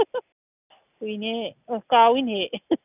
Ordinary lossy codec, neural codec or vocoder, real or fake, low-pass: none; none; real; 3.6 kHz